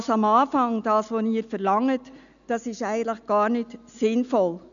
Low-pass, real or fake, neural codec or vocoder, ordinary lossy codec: 7.2 kHz; real; none; none